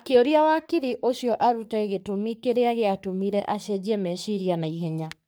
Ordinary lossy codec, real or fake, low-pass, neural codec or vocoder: none; fake; none; codec, 44.1 kHz, 3.4 kbps, Pupu-Codec